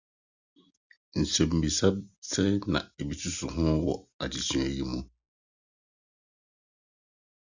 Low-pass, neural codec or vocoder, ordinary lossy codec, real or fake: 7.2 kHz; none; Opus, 64 kbps; real